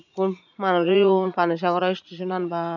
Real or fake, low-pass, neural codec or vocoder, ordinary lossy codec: fake; 7.2 kHz; vocoder, 44.1 kHz, 80 mel bands, Vocos; none